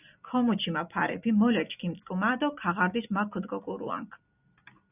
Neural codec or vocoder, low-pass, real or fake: none; 3.6 kHz; real